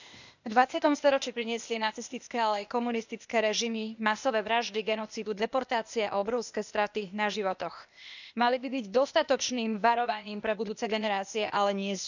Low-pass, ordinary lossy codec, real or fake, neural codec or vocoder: 7.2 kHz; none; fake; codec, 16 kHz, 0.8 kbps, ZipCodec